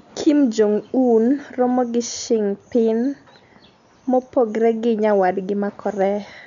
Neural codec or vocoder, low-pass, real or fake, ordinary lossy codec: none; 7.2 kHz; real; none